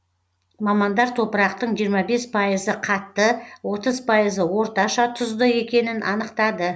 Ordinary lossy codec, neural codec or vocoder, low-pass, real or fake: none; none; none; real